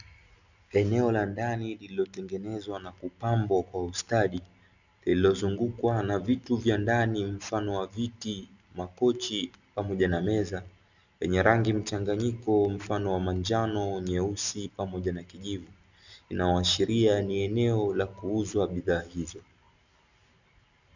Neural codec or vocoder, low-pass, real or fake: none; 7.2 kHz; real